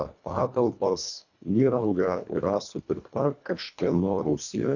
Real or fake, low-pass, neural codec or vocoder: fake; 7.2 kHz; codec, 24 kHz, 1.5 kbps, HILCodec